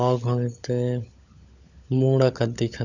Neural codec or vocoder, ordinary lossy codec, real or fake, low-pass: codec, 16 kHz, 16 kbps, FunCodec, trained on LibriTTS, 50 frames a second; none; fake; 7.2 kHz